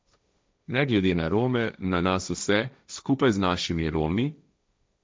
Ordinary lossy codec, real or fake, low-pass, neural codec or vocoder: none; fake; none; codec, 16 kHz, 1.1 kbps, Voila-Tokenizer